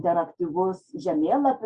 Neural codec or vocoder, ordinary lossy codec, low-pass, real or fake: none; Opus, 64 kbps; 9.9 kHz; real